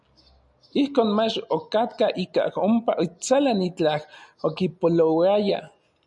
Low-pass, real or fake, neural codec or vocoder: 10.8 kHz; real; none